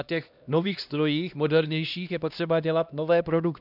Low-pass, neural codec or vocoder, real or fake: 5.4 kHz; codec, 16 kHz, 1 kbps, X-Codec, HuBERT features, trained on LibriSpeech; fake